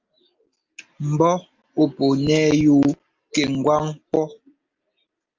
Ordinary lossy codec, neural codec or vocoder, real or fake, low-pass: Opus, 24 kbps; none; real; 7.2 kHz